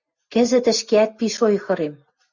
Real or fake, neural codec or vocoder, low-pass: real; none; 7.2 kHz